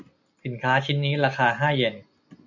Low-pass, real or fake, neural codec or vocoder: 7.2 kHz; real; none